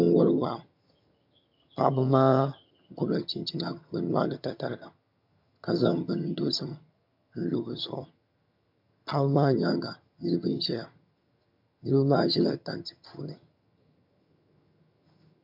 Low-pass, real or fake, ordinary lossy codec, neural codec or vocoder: 5.4 kHz; fake; MP3, 48 kbps; vocoder, 22.05 kHz, 80 mel bands, HiFi-GAN